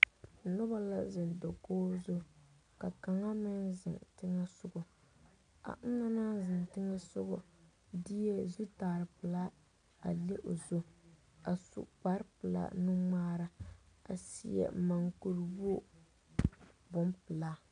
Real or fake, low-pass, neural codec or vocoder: real; 9.9 kHz; none